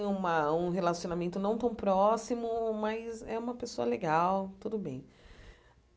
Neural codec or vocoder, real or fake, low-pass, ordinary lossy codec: none; real; none; none